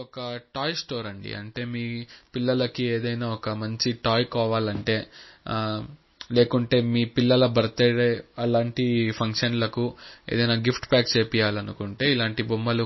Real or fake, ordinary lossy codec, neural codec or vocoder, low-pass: real; MP3, 24 kbps; none; 7.2 kHz